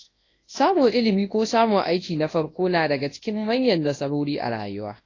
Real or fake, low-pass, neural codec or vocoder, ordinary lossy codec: fake; 7.2 kHz; codec, 24 kHz, 0.9 kbps, WavTokenizer, large speech release; AAC, 32 kbps